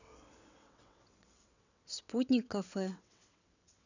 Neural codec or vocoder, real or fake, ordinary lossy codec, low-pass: vocoder, 22.05 kHz, 80 mel bands, WaveNeXt; fake; none; 7.2 kHz